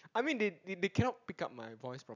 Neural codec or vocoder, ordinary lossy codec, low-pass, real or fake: none; none; 7.2 kHz; real